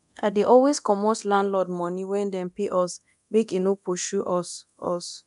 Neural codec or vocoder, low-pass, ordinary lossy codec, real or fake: codec, 24 kHz, 0.9 kbps, DualCodec; 10.8 kHz; none; fake